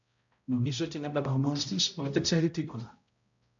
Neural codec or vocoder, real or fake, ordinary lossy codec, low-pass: codec, 16 kHz, 0.5 kbps, X-Codec, HuBERT features, trained on balanced general audio; fake; MP3, 64 kbps; 7.2 kHz